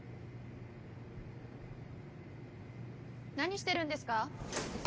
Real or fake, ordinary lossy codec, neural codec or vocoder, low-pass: real; none; none; none